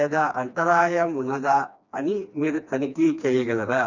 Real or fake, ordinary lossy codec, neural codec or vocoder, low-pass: fake; none; codec, 16 kHz, 2 kbps, FreqCodec, smaller model; 7.2 kHz